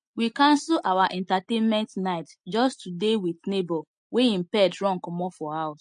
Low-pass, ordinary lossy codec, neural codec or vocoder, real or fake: 9.9 kHz; MP3, 48 kbps; none; real